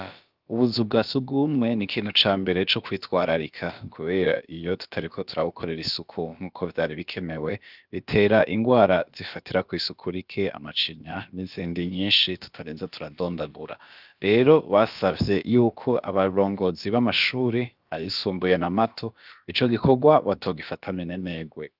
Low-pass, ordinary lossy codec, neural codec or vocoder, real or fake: 5.4 kHz; Opus, 24 kbps; codec, 16 kHz, about 1 kbps, DyCAST, with the encoder's durations; fake